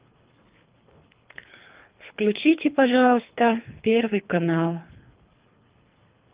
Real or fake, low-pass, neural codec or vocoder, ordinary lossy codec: fake; 3.6 kHz; codec, 24 kHz, 3 kbps, HILCodec; Opus, 32 kbps